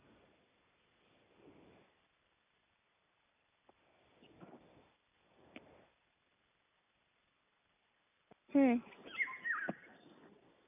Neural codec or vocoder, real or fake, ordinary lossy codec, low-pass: none; real; none; 3.6 kHz